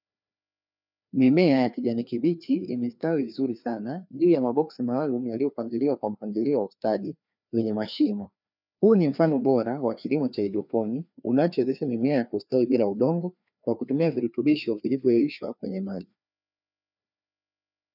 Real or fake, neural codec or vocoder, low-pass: fake; codec, 16 kHz, 2 kbps, FreqCodec, larger model; 5.4 kHz